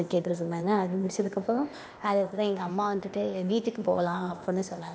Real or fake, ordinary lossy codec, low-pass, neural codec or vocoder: fake; none; none; codec, 16 kHz, 0.8 kbps, ZipCodec